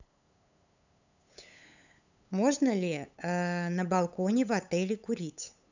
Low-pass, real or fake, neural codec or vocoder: 7.2 kHz; fake; codec, 16 kHz, 8 kbps, FunCodec, trained on LibriTTS, 25 frames a second